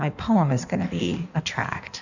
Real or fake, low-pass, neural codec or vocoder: fake; 7.2 kHz; codec, 16 kHz in and 24 kHz out, 1.1 kbps, FireRedTTS-2 codec